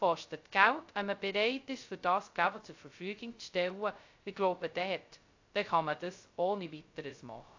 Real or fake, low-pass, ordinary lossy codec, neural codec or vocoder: fake; 7.2 kHz; AAC, 48 kbps; codec, 16 kHz, 0.2 kbps, FocalCodec